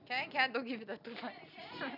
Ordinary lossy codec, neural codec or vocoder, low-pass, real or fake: none; none; 5.4 kHz; real